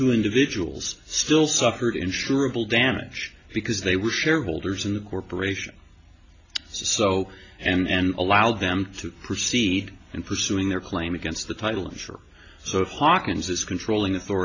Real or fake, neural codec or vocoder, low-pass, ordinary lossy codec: real; none; 7.2 kHz; AAC, 32 kbps